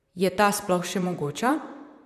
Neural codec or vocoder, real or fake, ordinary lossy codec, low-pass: vocoder, 44.1 kHz, 128 mel bands, Pupu-Vocoder; fake; none; 14.4 kHz